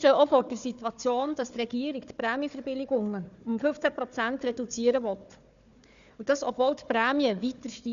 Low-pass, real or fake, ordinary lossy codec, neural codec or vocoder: 7.2 kHz; fake; none; codec, 16 kHz, 4 kbps, FunCodec, trained on Chinese and English, 50 frames a second